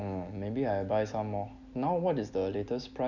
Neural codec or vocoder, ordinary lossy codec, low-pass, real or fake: none; none; 7.2 kHz; real